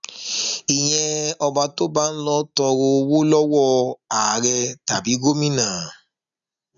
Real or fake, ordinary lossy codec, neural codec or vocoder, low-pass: real; none; none; 7.2 kHz